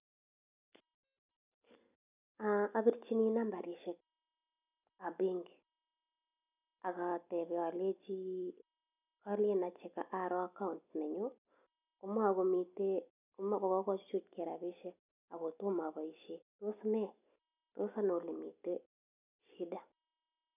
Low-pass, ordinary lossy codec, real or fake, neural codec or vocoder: 3.6 kHz; none; real; none